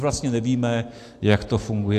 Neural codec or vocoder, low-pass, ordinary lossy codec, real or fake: none; 14.4 kHz; AAC, 64 kbps; real